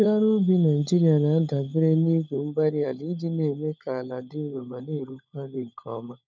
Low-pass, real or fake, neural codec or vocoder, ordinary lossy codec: none; fake; codec, 16 kHz, 16 kbps, FunCodec, trained on LibriTTS, 50 frames a second; none